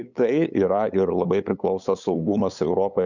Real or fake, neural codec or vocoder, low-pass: fake; codec, 16 kHz, 8 kbps, FunCodec, trained on LibriTTS, 25 frames a second; 7.2 kHz